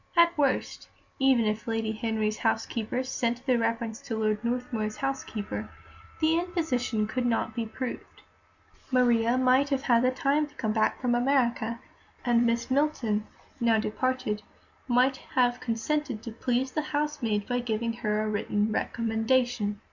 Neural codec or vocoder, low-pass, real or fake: none; 7.2 kHz; real